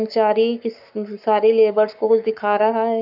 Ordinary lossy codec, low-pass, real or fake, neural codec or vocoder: none; 5.4 kHz; fake; codec, 44.1 kHz, 7.8 kbps, Pupu-Codec